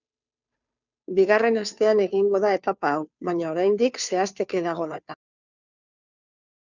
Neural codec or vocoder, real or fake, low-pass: codec, 16 kHz, 2 kbps, FunCodec, trained on Chinese and English, 25 frames a second; fake; 7.2 kHz